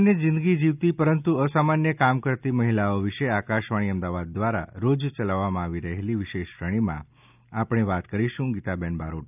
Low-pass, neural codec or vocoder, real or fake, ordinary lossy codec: 3.6 kHz; none; real; none